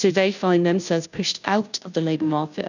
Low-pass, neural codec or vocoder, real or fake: 7.2 kHz; codec, 16 kHz, 0.5 kbps, FunCodec, trained on Chinese and English, 25 frames a second; fake